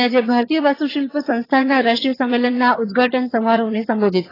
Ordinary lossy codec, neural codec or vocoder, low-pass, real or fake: AAC, 24 kbps; vocoder, 22.05 kHz, 80 mel bands, HiFi-GAN; 5.4 kHz; fake